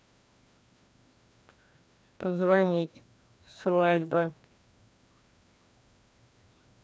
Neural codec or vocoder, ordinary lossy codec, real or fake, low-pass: codec, 16 kHz, 1 kbps, FreqCodec, larger model; none; fake; none